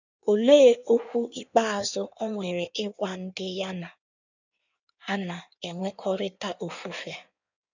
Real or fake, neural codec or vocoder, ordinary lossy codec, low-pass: fake; codec, 16 kHz in and 24 kHz out, 1.1 kbps, FireRedTTS-2 codec; none; 7.2 kHz